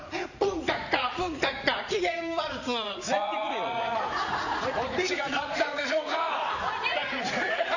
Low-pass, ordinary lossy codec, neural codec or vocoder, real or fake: 7.2 kHz; none; vocoder, 44.1 kHz, 80 mel bands, Vocos; fake